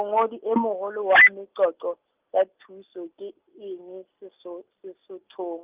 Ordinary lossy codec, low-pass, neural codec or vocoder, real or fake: Opus, 24 kbps; 3.6 kHz; none; real